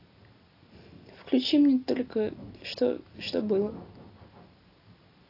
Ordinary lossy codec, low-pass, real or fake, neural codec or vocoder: AAC, 32 kbps; 5.4 kHz; real; none